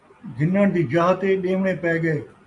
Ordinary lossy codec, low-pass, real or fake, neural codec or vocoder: MP3, 64 kbps; 10.8 kHz; real; none